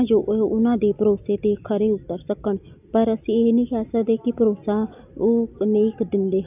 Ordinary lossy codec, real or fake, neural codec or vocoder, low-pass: none; real; none; 3.6 kHz